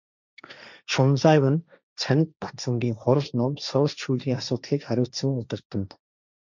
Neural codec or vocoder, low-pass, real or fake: codec, 16 kHz, 1.1 kbps, Voila-Tokenizer; 7.2 kHz; fake